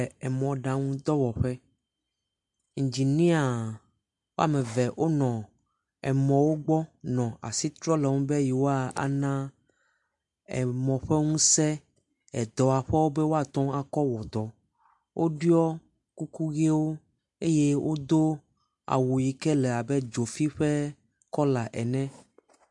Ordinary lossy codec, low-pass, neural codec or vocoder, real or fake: MP3, 64 kbps; 10.8 kHz; none; real